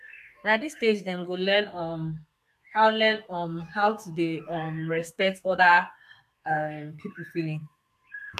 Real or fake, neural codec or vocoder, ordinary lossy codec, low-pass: fake; codec, 32 kHz, 1.9 kbps, SNAC; MP3, 96 kbps; 14.4 kHz